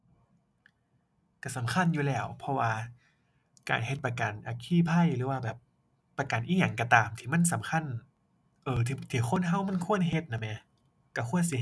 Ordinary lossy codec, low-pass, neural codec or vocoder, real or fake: none; none; none; real